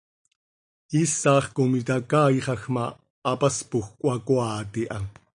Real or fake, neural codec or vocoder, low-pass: real; none; 9.9 kHz